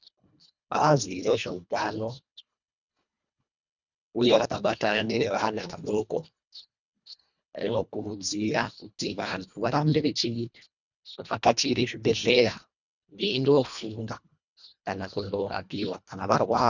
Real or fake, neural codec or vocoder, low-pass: fake; codec, 24 kHz, 1.5 kbps, HILCodec; 7.2 kHz